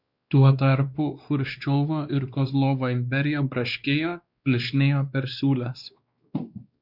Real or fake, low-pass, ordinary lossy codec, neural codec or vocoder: fake; 5.4 kHz; Opus, 64 kbps; codec, 16 kHz, 2 kbps, X-Codec, WavLM features, trained on Multilingual LibriSpeech